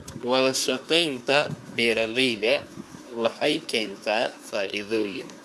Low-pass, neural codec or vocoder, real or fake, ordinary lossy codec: none; codec, 24 kHz, 1 kbps, SNAC; fake; none